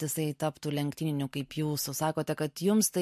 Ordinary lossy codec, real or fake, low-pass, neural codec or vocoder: MP3, 64 kbps; real; 14.4 kHz; none